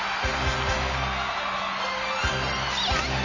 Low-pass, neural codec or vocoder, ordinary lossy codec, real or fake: 7.2 kHz; none; none; real